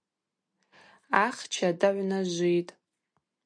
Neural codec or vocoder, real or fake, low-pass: none; real; 9.9 kHz